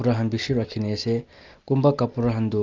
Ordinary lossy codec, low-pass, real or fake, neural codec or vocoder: Opus, 32 kbps; 7.2 kHz; real; none